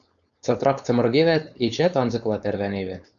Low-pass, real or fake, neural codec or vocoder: 7.2 kHz; fake; codec, 16 kHz, 4.8 kbps, FACodec